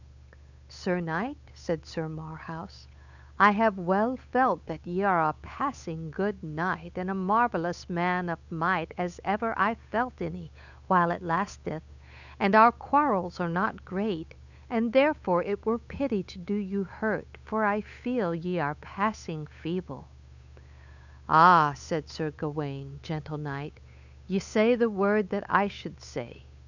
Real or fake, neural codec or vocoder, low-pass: fake; codec, 16 kHz, 8 kbps, FunCodec, trained on Chinese and English, 25 frames a second; 7.2 kHz